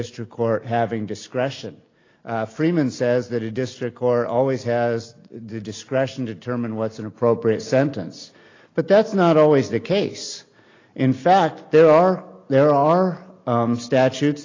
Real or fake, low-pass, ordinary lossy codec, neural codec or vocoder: real; 7.2 kHz; AAC, 32 kbps; none